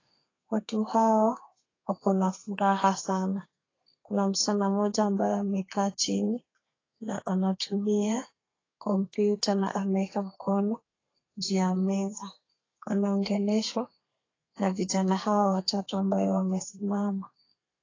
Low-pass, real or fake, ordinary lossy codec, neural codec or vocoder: 7.2 kHz; fake; AAC, 32 kbps; codec, 32 kHz, 1.9 kbps, SNAC